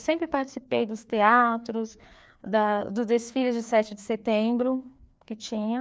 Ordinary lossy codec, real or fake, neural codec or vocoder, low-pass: none; fake; codec, 16 kHz, 2 kbps, FreqCodec, larger model; none